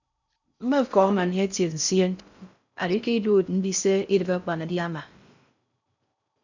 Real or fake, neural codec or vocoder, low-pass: fake; codec, 16 kHz in and 24 kHz out, 0.6 kbps, FocalCodec, streaming, 4096 codes; 7.2 kHz